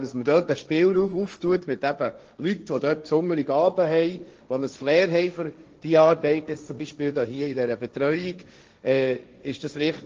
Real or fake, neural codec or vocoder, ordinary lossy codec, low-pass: fake; codec, 16 kHz, 1.1 kbps, Voila-Tokenizer; Opus, 24 kbps; 7.2 kHz